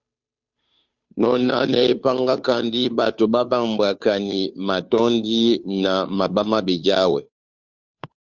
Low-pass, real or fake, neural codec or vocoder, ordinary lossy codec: 7.2 kHz; fake; codec, 16 kHz, 2 kbps, FunCodec, trained on Chinese and English, 25 frames a second; Opus, 64 kbps